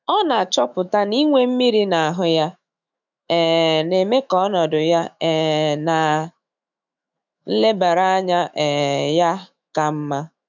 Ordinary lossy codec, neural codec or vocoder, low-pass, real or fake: none; autoencoder, 48 kHz, 128 numbers a frame, DAC-VAE, trained on Japanese speech; 7.2 kHz; fake